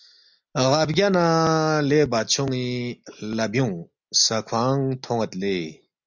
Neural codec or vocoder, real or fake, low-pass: none; real; 7.2 kHz